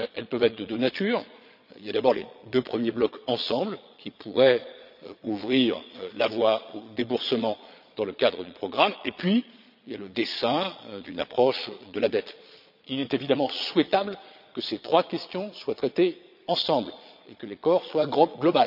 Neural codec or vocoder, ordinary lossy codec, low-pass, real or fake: vocoder, 22.05 kHz, 80 mel bands, Vocos; none; 5.4 kHz; fake